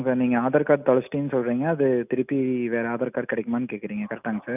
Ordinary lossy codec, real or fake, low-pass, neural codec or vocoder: none; real; 3.6 kHz; none